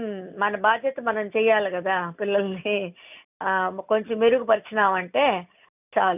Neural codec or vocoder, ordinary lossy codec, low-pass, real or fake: none; none; 3.6 kHz; real